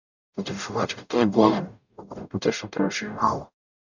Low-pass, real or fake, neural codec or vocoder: 7.2 kHz; fake; codec, 44.1 kHz, 0.9 kbps, DAC